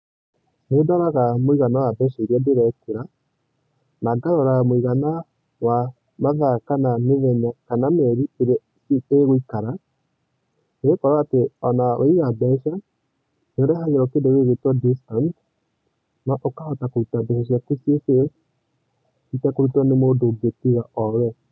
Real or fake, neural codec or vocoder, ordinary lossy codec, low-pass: real; none; none; none